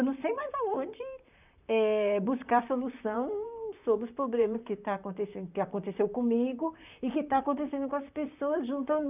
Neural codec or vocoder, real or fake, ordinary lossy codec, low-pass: vocoder, 44.1 kHz, 128 mel bands, Pupu-Vocoder; fake; none; 3.6 kHz